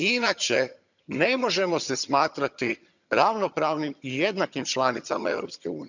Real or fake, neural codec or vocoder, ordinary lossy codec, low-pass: fake; vocoder, 22.05 kHz, 80 mel bands, HiFi-GAN; none; 7.2 kHz